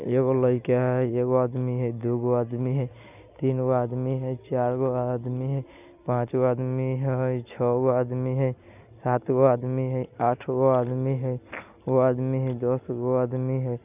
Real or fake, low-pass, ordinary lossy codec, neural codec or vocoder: real; 3.6 kHz; none; none